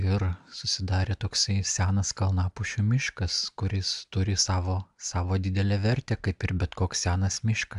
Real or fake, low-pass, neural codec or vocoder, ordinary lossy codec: real; 10.8 kHz; none; AAC, 96 kbps